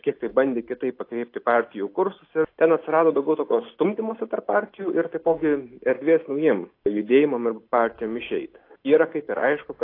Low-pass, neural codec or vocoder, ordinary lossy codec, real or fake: 5.4 kHz; none; AAC, 32 kbps; real